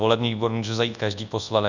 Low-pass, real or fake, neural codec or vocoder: 7.2 kHz; fake; codec, 24 kHz, 0.9 kbps, WavTokenizer, large speech release